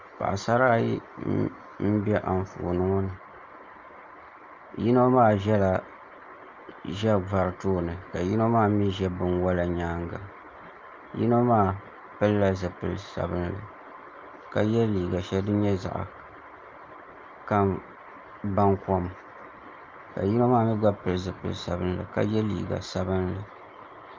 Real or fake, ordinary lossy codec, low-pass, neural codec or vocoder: real; Opus, 32 kbps; 7.2 kHz; none